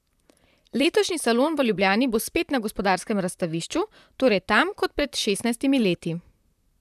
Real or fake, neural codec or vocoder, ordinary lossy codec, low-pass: fake; vocoder, 44.1 kHz, 128 mel bands, Pupu-Vocoder; none; 14.4 kHz